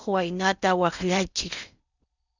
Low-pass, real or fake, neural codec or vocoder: 7.2 kHz; fake; codec, 16 kHz in and 24 kHz out, 0.8 kbps, FocalCodec, streaming, 65536 codes